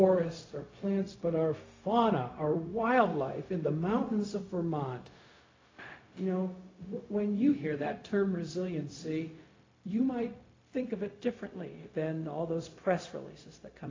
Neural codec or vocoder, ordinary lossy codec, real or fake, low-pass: codec, 16 kHz, 0.4 kbps, LongCat-Audio-Codec; AAC, 32 kbps; fake; 7.2 kHz